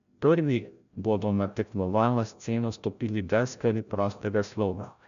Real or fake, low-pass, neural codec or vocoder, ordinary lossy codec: fake; 7.2 kHz; codec, 16 kHz, 0.5 kbps, FreqCodec, larger model; none